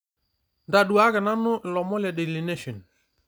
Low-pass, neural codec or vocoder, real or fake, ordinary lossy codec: none; none; real; none